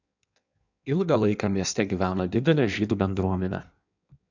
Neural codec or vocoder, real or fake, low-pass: codec, 16 kHz in and 24 kHz out, 1.1 kbps, FireRedTTS-2 codec; fake; 7.2 kHz